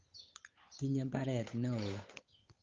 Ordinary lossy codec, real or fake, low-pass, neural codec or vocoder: Opus, 32 kbps; fake; 7.2 kHz; codec, 16 kHz, 8 kbps, FunCodec, trained on Chinese and English, 25 frames a second